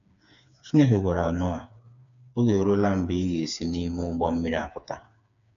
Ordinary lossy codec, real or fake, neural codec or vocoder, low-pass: none; fake; codec, 16 kHz, 4 kbps, FreqCodec, smaller model; 7.2 kHz